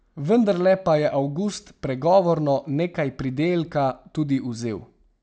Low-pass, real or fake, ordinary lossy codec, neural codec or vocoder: none; real; none; none